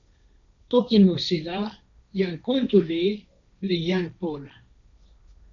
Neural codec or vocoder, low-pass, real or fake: codec, 16 kHz, 1.1 kbps, Voila-Tokenizer; 7.2 kHz; fake